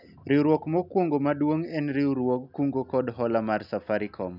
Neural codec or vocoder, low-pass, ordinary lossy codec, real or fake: none; 5.4 kHz; none; real